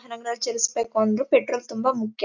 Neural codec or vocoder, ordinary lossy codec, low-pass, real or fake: none; none; 7.2 kHz; real